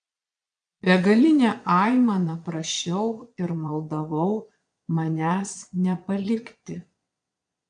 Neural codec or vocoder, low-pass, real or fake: vocoder, 22.05 kHz, 80 mel bands, Vocos; 9.9 kHz; fake